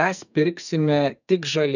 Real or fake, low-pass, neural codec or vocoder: fake; 7.2 kHz; codec, 44.1 kHz, 2.6 kbps, SNAC